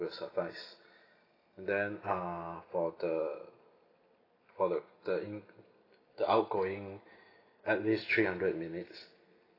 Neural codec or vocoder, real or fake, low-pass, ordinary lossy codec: none; real; 5.4 kHz; AAC, 24 kbps